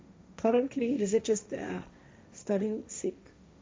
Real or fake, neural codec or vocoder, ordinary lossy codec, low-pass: fake; codec, 16 kHz, 1.1 kbps, Voila-Tokenizer; none; none